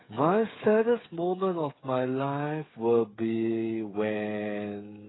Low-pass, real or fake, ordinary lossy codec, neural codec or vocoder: 7.2 kHz; fake; AAC, 16 kbps; codec, 16 kHz, 8 kbps, FreqCodec, smaller model